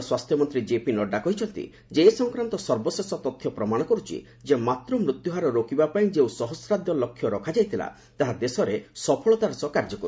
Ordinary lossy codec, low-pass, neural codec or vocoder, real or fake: none; none; none; real